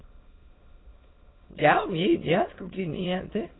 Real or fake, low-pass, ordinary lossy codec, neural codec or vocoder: fake; 7.2 kHz; AAC, 16 kbps; autoencoder, 22.05 kHz, a latent of 192 numbers a frame, VITS, trained on many speakers